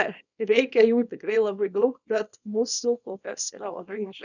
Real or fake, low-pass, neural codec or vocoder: fake; 7.2 kHz; codec, 24 kHz, 0.9 kbps, WavTokenizer, small release